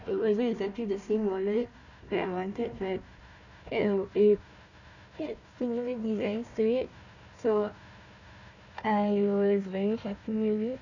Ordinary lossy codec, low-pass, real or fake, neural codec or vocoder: none; 7.2 kHz; fake; codec, 16 kHz, 1 kbps, FunCodec, trained on Chinese and English, 50 frames a second